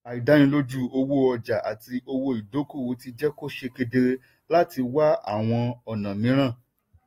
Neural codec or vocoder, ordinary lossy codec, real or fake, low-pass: none; AAC, 48 kbps; real; 19.8 kHz